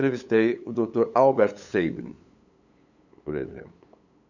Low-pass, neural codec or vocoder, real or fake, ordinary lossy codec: 7.2 kHz; codec, 16 kHz, 8 kbps, FunCodec, trained on LibriTTS, 25 frames a second; fake; none